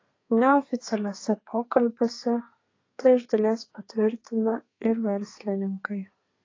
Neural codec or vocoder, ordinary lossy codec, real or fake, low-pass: codec, 32 kHz, 1.9 kbps, SNAC; AAC, 32 kbps; fake; 7.2 kHz